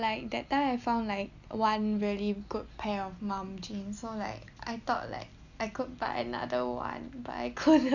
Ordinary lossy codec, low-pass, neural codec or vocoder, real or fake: none; 7.2 kHz; none; real